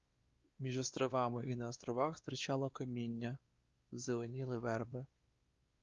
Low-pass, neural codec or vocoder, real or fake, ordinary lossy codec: 7.2 kHz; codec, 16 kHz, 2 kbps, X-Codec, WavLM features, trained on Multilingual LibriSpeech; fake; Opus, 32 kbps